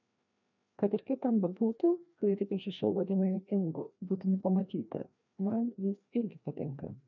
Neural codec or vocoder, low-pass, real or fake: codec, 16 kHz, 1 kbps, FreqCodec, larger model; 7.2 kHz; fake